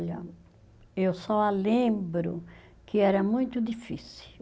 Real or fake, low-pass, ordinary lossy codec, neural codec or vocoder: real; none; none; none